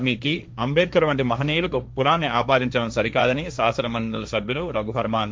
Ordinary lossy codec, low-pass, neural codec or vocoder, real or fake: none; none; codec, 16 kHz, 1.1 kbps, Voila-Tokenizer; fake